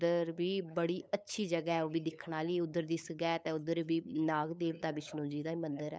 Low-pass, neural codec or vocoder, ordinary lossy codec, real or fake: none; codec, 16 kHz, 8 kbps, FunCodec, trained on LibriTTS, 25 frames a second; none; fake